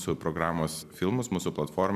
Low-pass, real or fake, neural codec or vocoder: 14.4 kHz; real; none